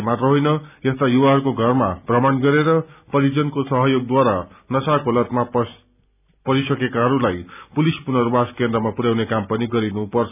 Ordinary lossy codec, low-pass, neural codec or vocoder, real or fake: none; 3.6 kHz; none; real